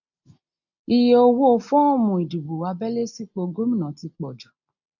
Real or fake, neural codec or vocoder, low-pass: real; none; 7.2 kHz